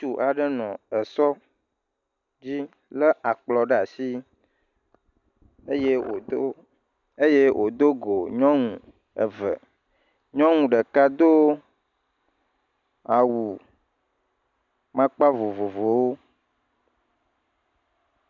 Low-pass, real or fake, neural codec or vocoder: 7.2 kHz; real; none